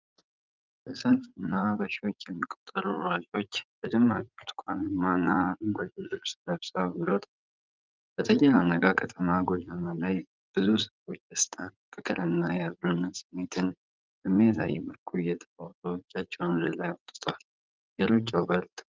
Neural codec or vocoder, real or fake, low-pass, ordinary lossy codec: vocoder, 44.1 kHz, 80 mel bands, Vocos; fake; 7.2 kHz; Opus, 24 kbps